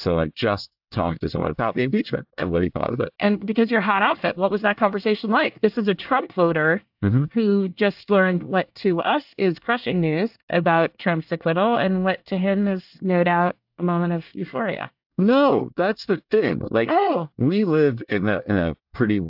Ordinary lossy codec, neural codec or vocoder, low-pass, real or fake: AAC, 48 kbps; codec, 24 kHz, 1 kbps, SNAC; 5.4 kHz; fake